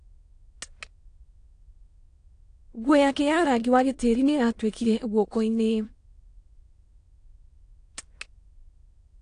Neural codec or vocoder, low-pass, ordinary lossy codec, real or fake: autoencoder, 22.05 kHz, a latent of 192 numbers a frame, VITS, trained on many speakers; 9.9 kHz; AAC, 48 kbps; fake